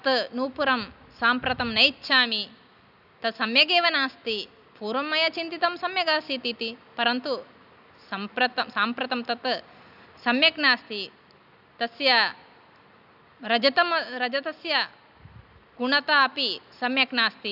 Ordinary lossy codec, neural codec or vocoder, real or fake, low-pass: none; none; real; 5.4 kHz